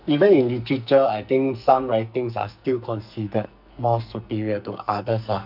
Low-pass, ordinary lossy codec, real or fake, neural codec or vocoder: 5.4 kHz; none; fake; codec, 44.1 kHz, 2.6 kbps, SNAC